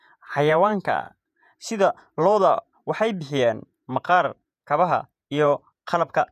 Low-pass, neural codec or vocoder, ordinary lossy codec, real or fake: 14.4 kHz; vocoder, 48 kHz, 128 mel bands, Vocos; none; fake